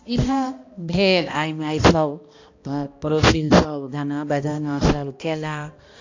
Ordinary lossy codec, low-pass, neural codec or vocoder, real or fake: AAC, 48 kbps; 7.2 kHz; codec, 16 kHz, 1 kbps, X-Codec, HuBERT features, trained on balanced general audio; fake